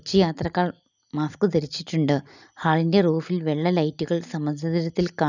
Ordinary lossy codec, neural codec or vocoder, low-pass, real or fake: none; none; 7.2 kHz; real